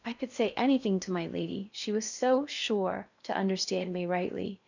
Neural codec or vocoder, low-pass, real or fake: codec, 16 kHz in and 24 kHz out, 0.6 kbps, FocalCodec, streaming, 2048 codes; 7.2 kHz; fake